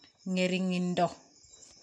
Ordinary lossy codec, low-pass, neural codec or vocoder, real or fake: none; 9.9 kHz; none; real